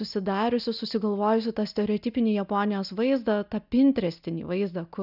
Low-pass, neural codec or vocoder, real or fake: 5.4 kHz; none; real